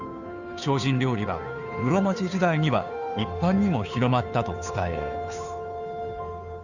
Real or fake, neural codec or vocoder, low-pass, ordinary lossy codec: fake; codec, 16 kHz, 2 kbps, FunCodec, trained on Chinese and English, 25 frames a second; 7.2 kHz; none